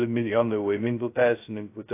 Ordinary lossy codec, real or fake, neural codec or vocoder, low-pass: AAC, 24 kbps; fake; codec, 16 kHz, 0.2 kbps, FocalCodec; 3.6 kHz